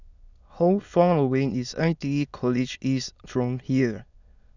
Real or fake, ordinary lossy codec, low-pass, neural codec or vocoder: fake; none; 7.2 kHz; autoencoder, 22.05 kHz, a latent of 192 numbers a frame, VITS, trained on many speakers